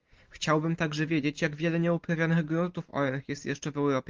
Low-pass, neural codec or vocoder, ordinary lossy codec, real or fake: 7.2 kHz; none; Opus, 24 kbps; real